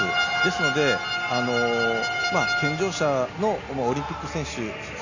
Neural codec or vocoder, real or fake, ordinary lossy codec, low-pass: none; real; none; 7.2 kHz